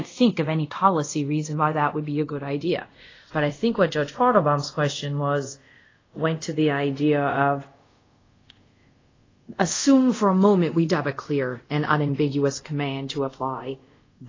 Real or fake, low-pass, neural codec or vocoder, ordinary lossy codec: fake; 7.2 kHz; codec, 24 kHz, 0.5 kbps, DualCodec; AAC, 32 kbps